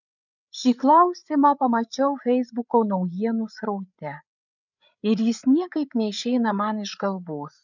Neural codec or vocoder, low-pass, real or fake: codec, 16 kHz, 8 kbps, FreqCodec, larger model; 7.2 kHz; fake